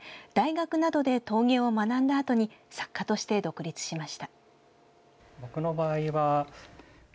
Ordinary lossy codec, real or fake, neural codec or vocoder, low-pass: none; real; none; none